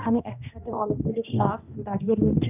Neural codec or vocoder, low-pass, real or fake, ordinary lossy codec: codec, 16 kHz, 1 kbps, X-Codec, HuBERT features, trained on general audio; 3.6 kHz; fake; none